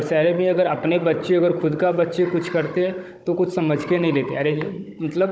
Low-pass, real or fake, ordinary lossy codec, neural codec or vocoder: none; fake; none; codec, 16 kHz, 16 kbps, FunCodec, trained on Chinese and English, 50 frames a second